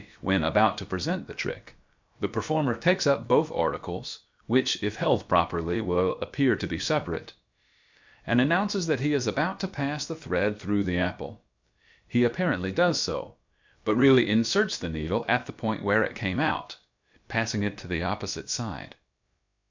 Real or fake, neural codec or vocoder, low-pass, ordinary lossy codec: fake; codec, 16 kHz, about 1 kbps, DyCAST, with the encoder's durations; 7.2 kHz; MP3, 64 kbps